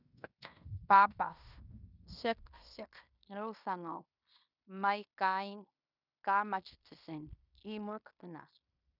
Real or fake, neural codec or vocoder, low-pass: fake; codec, 16 kHz in and 24 kHz out, 0.9 kbps, LongCat-Audio-Codec, fine tuned four codebook decoder; 5.4 kHz